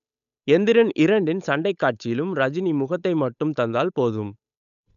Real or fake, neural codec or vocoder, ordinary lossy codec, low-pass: fake; codec, 16 kHz, 8 kbps, FunCodec, trained on Chinese and English, 25 frames a second; none; 7.2 kHz